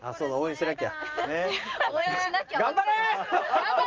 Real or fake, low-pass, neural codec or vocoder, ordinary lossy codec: real; 7.2 kHz; none; Opus, 24 kbps